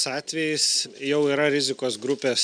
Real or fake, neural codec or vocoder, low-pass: real; none; 9.9 kHz